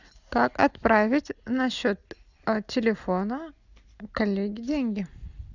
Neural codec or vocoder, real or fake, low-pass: none; real; 7.2 kHz